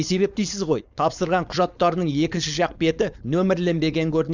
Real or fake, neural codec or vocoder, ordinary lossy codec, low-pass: fake; codec, 16 kHz, 4.8 kbps, FACodec; Opus, 64 kbps; 7.2 kHz